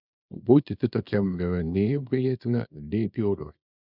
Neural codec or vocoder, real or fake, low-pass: codec, 24 kHz, 0.9 kbps, WavTokenizer, small release; fake; 5.4 kHz